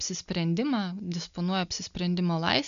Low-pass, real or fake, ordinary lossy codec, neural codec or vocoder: 7.2 kHz; real; AAC, 96 kbps; none